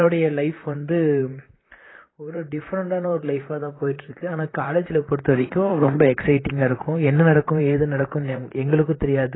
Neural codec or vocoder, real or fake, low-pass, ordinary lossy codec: vocoder, 44.1 kHz, 128 mel bands, Pupu-Vocoder; fake; 7.2 kHz; AAC, 16 kbps